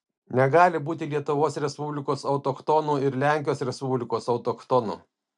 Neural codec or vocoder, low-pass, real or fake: none; 10.8 kHz; real